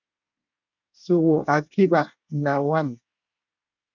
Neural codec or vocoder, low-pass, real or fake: codec, 24 kHz, 1 kbps, SNAC; 7.2 kHz; fake